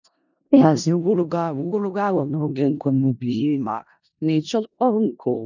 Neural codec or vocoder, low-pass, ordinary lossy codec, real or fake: codec, 16 kHz in and 24 kHz out, 0.4 kbps, LongCat-Audio-Codec, four codebook decoder; 7.2 kHz; none; fake